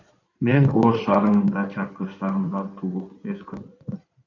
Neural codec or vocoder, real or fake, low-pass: codec, 16 kHz in and 24 kHz out, 2.2 kbps, FireRedTTS-2 codec; fake; 7.2 kHz